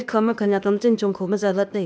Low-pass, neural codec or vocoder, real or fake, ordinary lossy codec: none; codec, 16 kHz, 0.8 kbps, ZipCodec; fake; none